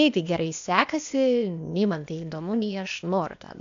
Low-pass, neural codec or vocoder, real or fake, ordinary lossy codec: 7.2 kHz; codec, 16 kHz, 0.8 kbps, ZipCodec; fake; AAC, 64 kbps